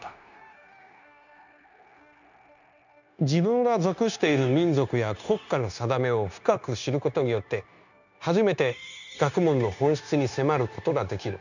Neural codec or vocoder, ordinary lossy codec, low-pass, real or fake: codec, 16 kHz, 0.9 kbps, LongCat-Audio-Codec; none; 7.2 kHz; fake